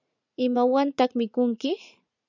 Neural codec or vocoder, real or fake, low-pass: vocoder, 44.1 kHz, 80 mel bands, Vocos; fake; 7.2 kHz